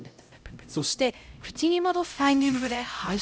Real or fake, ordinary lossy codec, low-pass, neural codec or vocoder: fake; none; none; codec, 16 kHz, 0.5 kbps, X-Codec, HuBERT features, trained on LibriSpeech